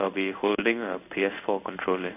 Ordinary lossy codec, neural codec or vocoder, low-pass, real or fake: none; codec, 16 kHz in and 24 kHz out, 1 kbps, XY-Tokenizer; 3.6 kHz; fake